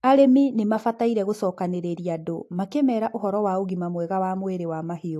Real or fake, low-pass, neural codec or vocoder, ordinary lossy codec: real; 14.4 kHz; none; AAC, 64 kbps